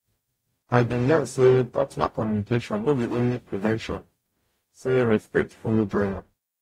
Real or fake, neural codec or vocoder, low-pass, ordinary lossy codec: fake; codec, 44.1 kHz, 0.9 kbps, DAC; 19.8 kHz; AAC, 48 kbps